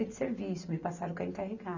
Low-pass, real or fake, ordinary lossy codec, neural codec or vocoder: 7.2 kHz; real; none; none